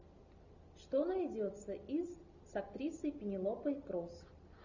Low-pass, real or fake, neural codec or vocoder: 7.2 kHz; real; none